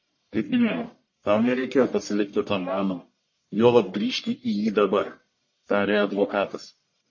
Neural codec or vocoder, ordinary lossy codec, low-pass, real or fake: codec, 44.1 kHz, 1.7 kbps, Pupu-Codec; MP3, 32 kbps; 7.2 kHz; fake